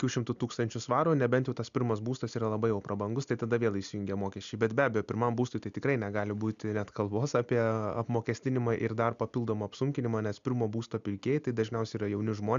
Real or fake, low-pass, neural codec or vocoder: real; 7.2 kHz; none